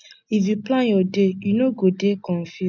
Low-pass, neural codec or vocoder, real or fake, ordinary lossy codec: none; none; real; none